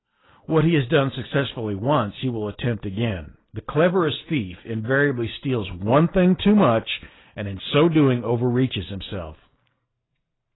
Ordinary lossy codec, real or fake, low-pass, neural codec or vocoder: AAC, 16 kbps; real; 7.2 kHz; none